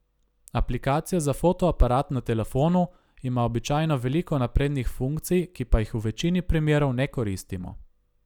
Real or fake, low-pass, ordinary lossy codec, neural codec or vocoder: real; 19.8 kHz; none; none